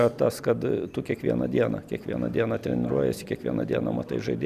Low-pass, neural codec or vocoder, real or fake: 14.4 kHz; none; real